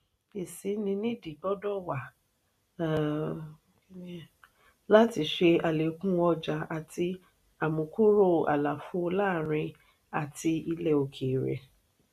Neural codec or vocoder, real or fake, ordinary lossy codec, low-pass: none; real; none; 14.4 kHz